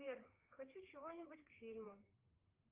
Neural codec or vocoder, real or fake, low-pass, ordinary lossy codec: codec, 16 kHz, 4 kbps, FreqCodec, larger model; fake; 3.6 kHz; Opus, 32 kbps